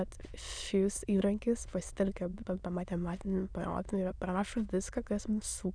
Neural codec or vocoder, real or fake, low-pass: autoencoder, 22.05 kHz, a latent of 192 numbers a frame, VITS, trained on many speakers; fake; 9.9 kHz